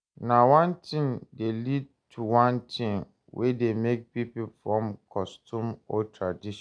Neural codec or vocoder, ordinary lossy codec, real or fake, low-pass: none; none; real; 9.9 kHz